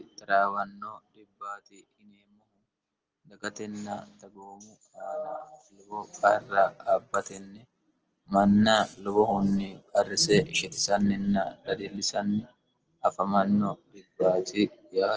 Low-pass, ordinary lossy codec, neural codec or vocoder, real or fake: 7.2 kHz; Opus, 16 kbps; none; real